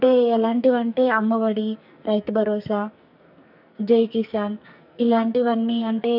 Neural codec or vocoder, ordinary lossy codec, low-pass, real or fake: codec, 44.1 kHz, 2.6 kbps, SNAC; none; 5.4 kHz; fake